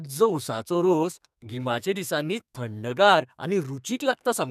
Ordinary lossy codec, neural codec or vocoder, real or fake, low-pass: none; codec, 32 kHz, 1.9 kbps, SNAC; fake; 14.4 kHz